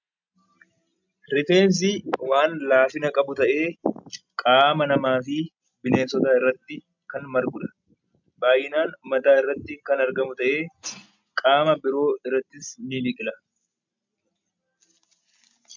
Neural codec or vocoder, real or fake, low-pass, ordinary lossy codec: none; real; 7.2 kHz; MP3, 64 kbps